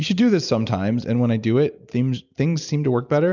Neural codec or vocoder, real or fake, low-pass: none; real; 7.2 kHz